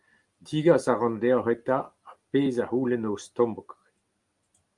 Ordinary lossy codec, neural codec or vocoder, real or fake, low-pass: Opus, 32 kbps; none; real; 10.8 kHz